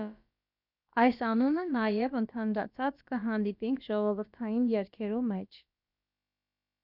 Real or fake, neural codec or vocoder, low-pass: fake; codec, 16 kHz, about 1 kbps, DyCAST, with the encoder's durations; 5.4 kHz